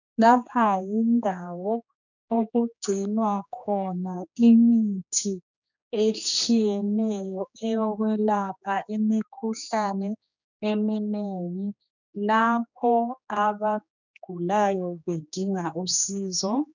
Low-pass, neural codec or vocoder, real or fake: 7.2 kHz; codec, 16 kHz, 2 kbps, X-Codec, HuBERT features, trained on general audio; fake